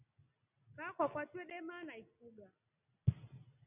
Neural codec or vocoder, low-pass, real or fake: none; 3.6 kHz; real